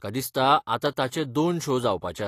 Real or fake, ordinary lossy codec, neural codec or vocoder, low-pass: real; AAC, 48 kbps; none; 14.4 kHz